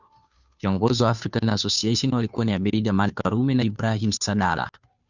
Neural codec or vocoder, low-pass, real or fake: codec, 16 kHz, 2 kbps, FunCodec, trained on Chinese and English, 25 frames a second; 7.2 kHz; fake